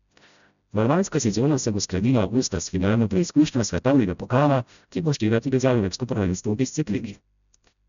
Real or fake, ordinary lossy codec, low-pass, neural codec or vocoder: fake; none; 7.2 kHz; codec, 16 kHz, 0.5 kbps, FreqCodec, smaller model